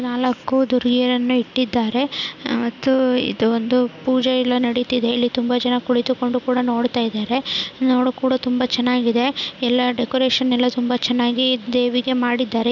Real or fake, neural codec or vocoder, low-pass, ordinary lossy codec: real; none; 7.2 kHz; none